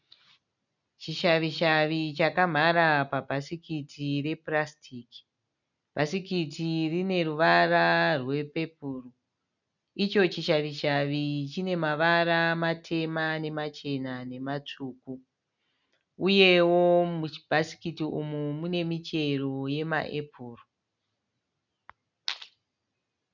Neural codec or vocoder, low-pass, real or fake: none; 7.2 kHz; real